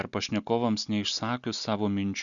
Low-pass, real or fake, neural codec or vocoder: 7.2 kHz; real; none